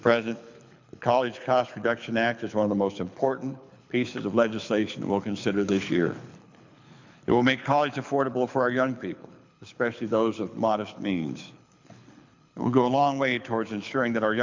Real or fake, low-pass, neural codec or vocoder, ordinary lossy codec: fake; 7.2 kHz; codec, 24 kHz, 6 kbps, HILCodec; MP3, 64 kbps